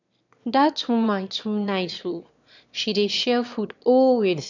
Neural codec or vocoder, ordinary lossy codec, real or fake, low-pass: autoencoder, 22.05 kHz, a latent of 192 numbers a frame, VITS, trained on one speaker; none; fake; 7.2 kHz